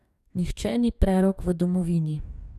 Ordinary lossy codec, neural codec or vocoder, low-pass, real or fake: none; codec, 44.1 kHz, 2.6 kbps, DAC; 14.4 kHz; fake